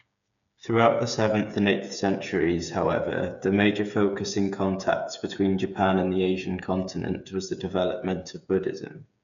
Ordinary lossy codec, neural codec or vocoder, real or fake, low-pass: none; codec, 16 kHz, 8 kbps, FreqCodec, smaller model; fake; 7.2 kHz